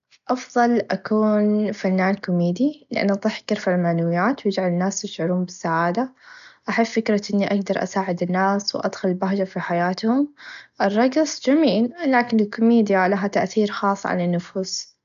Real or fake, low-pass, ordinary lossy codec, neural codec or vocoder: real; 7.2 kHz; none; none